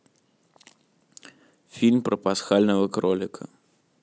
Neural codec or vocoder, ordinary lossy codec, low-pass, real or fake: none; none; none; real